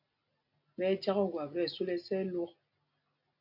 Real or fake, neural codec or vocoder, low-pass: real; none; 5.4 kHz